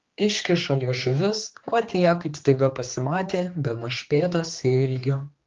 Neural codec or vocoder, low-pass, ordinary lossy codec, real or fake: codec, 16 kHz, 2 kbps, X-Codec, HuBERT features, trained on general audio; 7.2 kHz; Opus, 32 kbps; fake